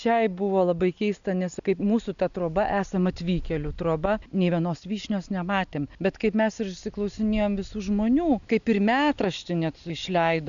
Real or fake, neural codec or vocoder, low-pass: real; none; 7.2 kHz